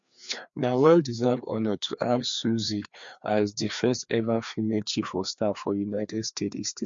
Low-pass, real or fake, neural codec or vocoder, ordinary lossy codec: 7.2 kHz; fake; codec, 16 kHz, 2 kbps, FreqCodec, larger model; MP3, 48 kbps